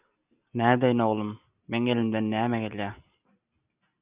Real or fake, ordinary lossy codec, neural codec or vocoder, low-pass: real; Opus, 64 kbps; none; 3.6 kHz